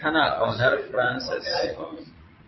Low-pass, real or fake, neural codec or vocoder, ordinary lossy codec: 7.2 kHz; fake; vocoder, 22.05 kHz, 80 mel bands, Vocos; MP3, 24 kbps